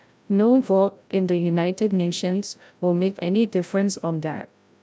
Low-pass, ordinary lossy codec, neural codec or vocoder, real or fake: none; none; codec, 16 kHz, 0.5 kbps, FreqCodec, larger model; fake